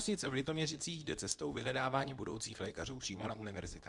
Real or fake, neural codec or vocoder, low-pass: fake; codec, 24 kHz, 0.9 kbps, WavTokenizer, medium speech release version 2; 10.8 kHz